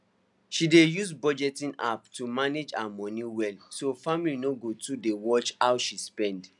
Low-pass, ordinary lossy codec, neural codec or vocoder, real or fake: 10.8 kHz; none; none; real